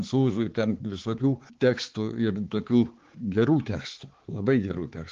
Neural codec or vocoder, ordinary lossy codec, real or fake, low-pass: codec, 16 kHz, 4 kbps, X-Codec, HuBERT features, trained on balanced general audio; Opus, 16 kbps; fake; 7.2 kHz